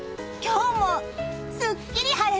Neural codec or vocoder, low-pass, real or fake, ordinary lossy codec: none; none; real; none